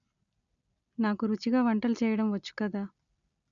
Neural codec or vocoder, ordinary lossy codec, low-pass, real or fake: none; none; 7.2 kHz; real